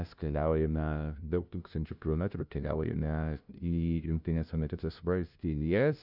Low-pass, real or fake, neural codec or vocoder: 5.4 kHz; fake; codec, 16 kHz, 0.5 kbps, FunCodec, trained on LibriTTS, 25 frames a second